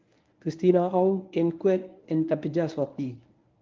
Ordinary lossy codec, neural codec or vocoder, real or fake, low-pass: Opus, 24 kbps; codec, 24 kHz, 0.9 kbps, WavTokenizer, medium speech release version 1; fake; 7.2 kHz